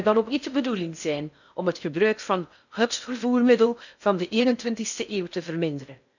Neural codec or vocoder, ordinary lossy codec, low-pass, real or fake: codec, 16 kHz in and 24 kHz out, 0.6 kbps, FocalCodec, streaming, 4096 codes; none; 7.2 kHz; fake